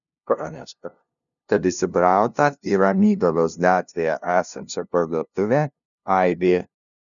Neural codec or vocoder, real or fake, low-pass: codec, 16 kHz, 0.5 kbps, FunCodec, trained on LibriTTS, 25 frames a second; fake; 7.2 kHz